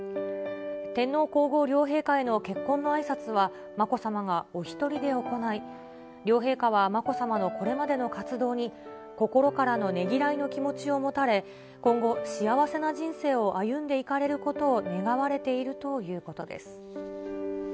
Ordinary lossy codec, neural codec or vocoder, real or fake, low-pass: none; none; real; none